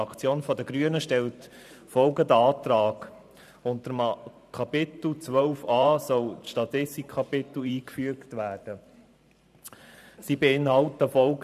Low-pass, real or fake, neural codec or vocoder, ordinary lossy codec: 14.4 kHz; fake; vocoder, 44.1 kHz, 128 mel bands every 512 samples, BigVGAN v2; none